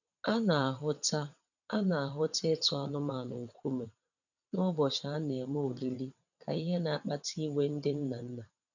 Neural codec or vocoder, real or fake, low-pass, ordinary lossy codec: vocoder, 22.05 kHz, 80 mel bands, WaveNeXt; fake; 7.2 kHz; none